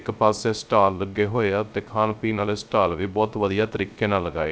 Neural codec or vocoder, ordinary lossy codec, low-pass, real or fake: codec, 16 kHz, 0.3 kbps, FocalCodec; none; none; fake